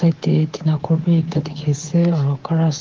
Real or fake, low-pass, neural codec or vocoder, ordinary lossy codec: fake; 7.2 kHz; codec, 24 kHz, 3.1 kbps, DualCodec; Opus, 16 kbps